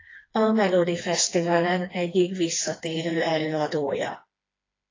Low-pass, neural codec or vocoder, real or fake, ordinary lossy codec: 7.2 kHz; codec, 16 kHz, 2 kbps, FreqCodec, smaller model; fake; AAC, 32 kbps